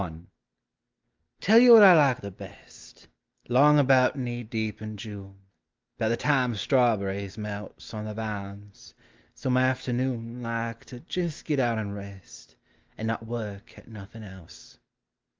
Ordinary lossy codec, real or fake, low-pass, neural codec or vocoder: Opus, 24 kbps; real; 7.2 kHz; none